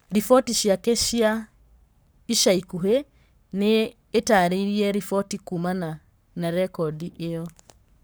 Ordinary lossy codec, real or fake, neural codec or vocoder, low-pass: none; fake; codec, 44.1 kHz, 7.8 kbps, Pupu-Codec; none